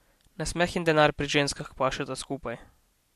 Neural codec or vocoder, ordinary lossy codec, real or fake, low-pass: none; MP3, 64 kbps; real; 14.4 kHz